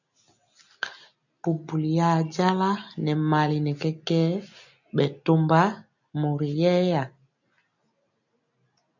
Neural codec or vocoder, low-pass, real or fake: none; 7.2 kHz; real